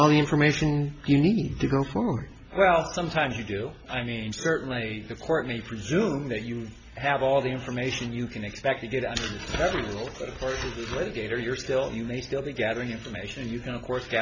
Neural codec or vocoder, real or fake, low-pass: none; real; 7.2 kHz